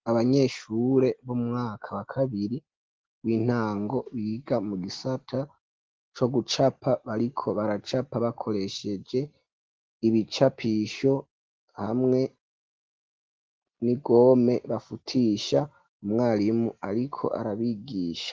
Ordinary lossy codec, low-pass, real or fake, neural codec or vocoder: Opus, 16 kbps; 7.2 kHz; real; none